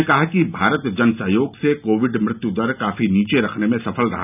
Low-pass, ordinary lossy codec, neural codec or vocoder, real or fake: 3.6 kHz; none; none; real